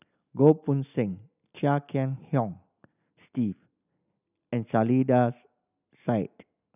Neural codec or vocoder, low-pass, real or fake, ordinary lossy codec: none; 3.6 kHz; real; none